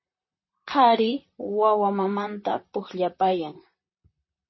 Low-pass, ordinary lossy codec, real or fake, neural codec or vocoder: 7.2 kHz; MP3, 24 kbps; fake; vocoder, 44.1 kHz, 128 mel bands, Pupu-Vocoder